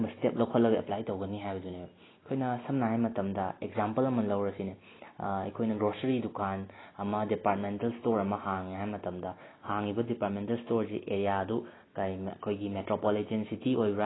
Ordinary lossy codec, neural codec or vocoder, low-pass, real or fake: AAC, 16 kbps; none; 7.2 kHz; real